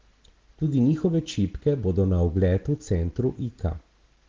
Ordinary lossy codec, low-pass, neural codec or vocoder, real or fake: Opus, 16 kbps; 7.2 kHz; none; real